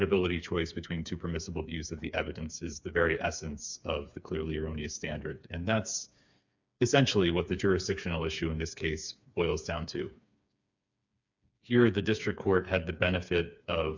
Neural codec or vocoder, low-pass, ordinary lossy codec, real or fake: codec, 16 kHz, 4 kbps, FreqCodec, smaller model; 7.2 kHz; MP3, 64 kbps; fake